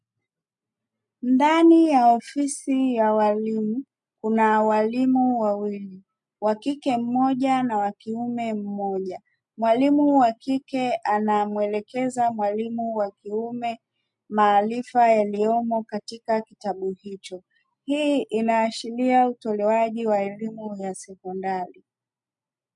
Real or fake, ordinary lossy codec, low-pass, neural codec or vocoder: real; MP3, 64 kbps; 10.8 kHz; none